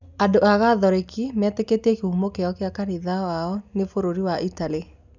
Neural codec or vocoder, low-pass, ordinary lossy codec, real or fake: none; 7.2 kHz; none; real